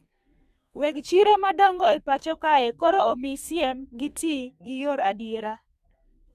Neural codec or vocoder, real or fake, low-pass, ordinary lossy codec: codec, 32 kHz, 1.9 kbps, SNAC; fake; 14.4 kHz; none